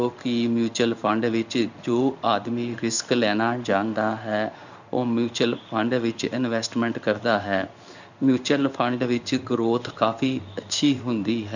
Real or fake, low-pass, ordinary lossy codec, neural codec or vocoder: fake; 7.2 kHz; none; codec, 16 kHz in and 24 kHz out, 1 kbps, XY-Tokenizer